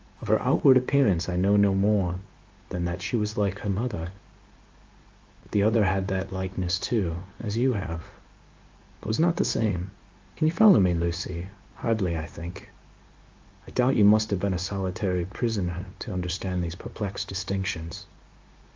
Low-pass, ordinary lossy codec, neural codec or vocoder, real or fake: 7.2 kHz; Opus, 24 kbps; codec, 16 kHz in and 24 kHz out, 1 kbps, XY-Tokenizer; fake